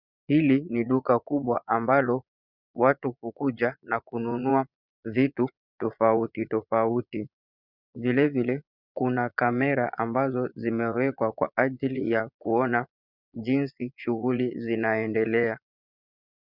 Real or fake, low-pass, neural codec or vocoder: fake; 5.4 kHz; vocoder, 22.05 kHz, 80 mel bands, Vocos